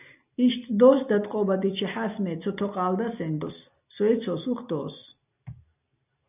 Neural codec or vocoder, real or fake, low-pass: none; real; 3.6 kHz